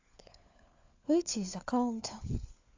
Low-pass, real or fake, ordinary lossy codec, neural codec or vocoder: 7.2 kHz; fake; AAC, 48 kbps; codec, 16 kHz, 4 kbps, FunCodec, trained on LibriTTS, 50 frames a second